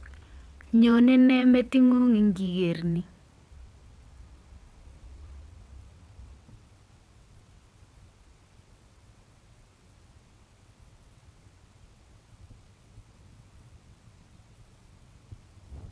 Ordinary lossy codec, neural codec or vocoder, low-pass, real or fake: none; vocoder, 22.05 kHz, 80 mel bands, WaveNeXt; none; fake